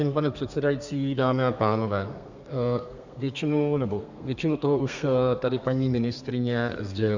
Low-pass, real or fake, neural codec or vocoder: 7.2 kHz; fake; codec, 32 kHz, 1.9 kbps, SNAC